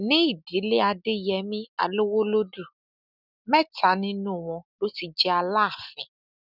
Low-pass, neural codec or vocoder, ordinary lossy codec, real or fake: 5.4 kHz; none; none; real